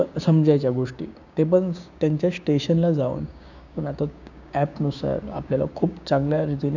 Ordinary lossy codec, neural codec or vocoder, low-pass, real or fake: none; codec, 16 kHz, 6 kbps, DAC; 7.2 kHz; fake